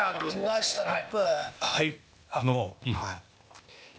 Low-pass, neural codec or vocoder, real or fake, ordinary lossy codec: none; codec, 16 kHz, 0.8 kbps, ZipCodec; fake; none